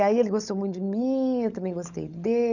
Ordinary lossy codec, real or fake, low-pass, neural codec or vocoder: none; fake; 7.2 kHz; codec, 16 kHz, 16 kbps, FunCodec, trained on LibriTTS, 50 frames a second